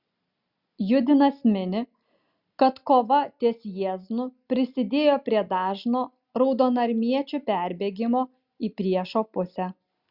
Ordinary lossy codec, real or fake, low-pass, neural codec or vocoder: Opus, 64 kbps; real; 5.4 kHz; none